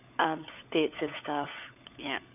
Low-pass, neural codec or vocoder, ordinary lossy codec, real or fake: 3.6 kHz; codec, 16 kHz, 16 kbps, FunCodec, trained on LibriTTS, 50 frames a second; none; fake